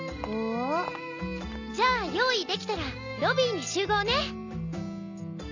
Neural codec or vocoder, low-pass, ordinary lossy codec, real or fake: none; 7.2 kHz; none; real